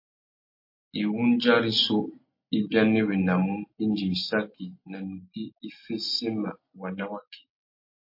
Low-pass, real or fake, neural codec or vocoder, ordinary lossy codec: 5.4 kHz; real; none; MP3, 32 kbps